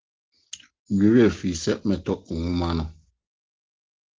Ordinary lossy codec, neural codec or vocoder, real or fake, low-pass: Opus, 16 kbps; none; real; 7.2 kHz